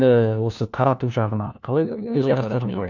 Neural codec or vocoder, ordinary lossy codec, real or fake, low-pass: codec, 16 kHz, 1 kbps, FunCodec, trained on LibriTTS, 50 frames a second; none; fake; 7.2 kHz